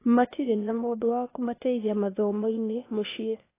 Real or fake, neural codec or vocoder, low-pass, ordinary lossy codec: fake; codec, 16 kHz, 0.8 kbps, ZipCodec; 3.6 kHz; AAC, 24 kbps